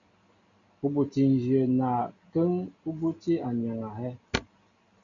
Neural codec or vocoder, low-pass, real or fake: none; 7.2 kHz; real